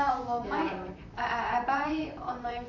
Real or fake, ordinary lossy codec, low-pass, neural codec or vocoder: fake; none; 7.2 kHz; vocoder, 44.1 kHz, 128 mel bands, Pupu-Vocoder